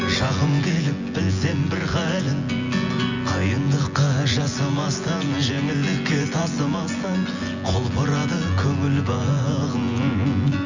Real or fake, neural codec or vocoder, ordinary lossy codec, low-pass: fake; vocoder, 24 kHz, 100 mel bands, Vocos; Opus, 64 kbps; 7.2 kHz